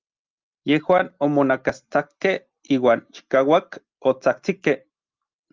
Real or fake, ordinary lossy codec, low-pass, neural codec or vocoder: real; Opus, 32 kbps; 7.2 kHz; none